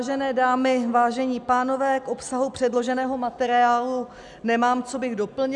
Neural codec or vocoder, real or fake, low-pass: none; real; 10.8 kHz